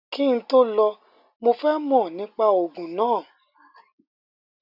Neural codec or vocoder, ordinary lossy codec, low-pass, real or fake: none; none; 5.4 kHz; real